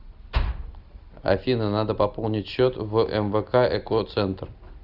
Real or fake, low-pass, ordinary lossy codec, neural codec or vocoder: real; 5.4 kHz; Opus, 64 kbps; none